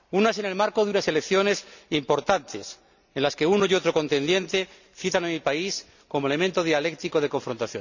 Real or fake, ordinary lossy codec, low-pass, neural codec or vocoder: real; none; 7.2 kHz; none